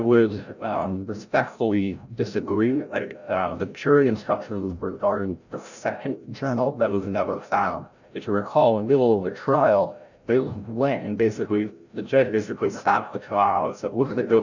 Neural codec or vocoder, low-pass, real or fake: codec, 16 kHz, 0.5 kbps, FreqCodec, larger model; 7.2 kHz; fake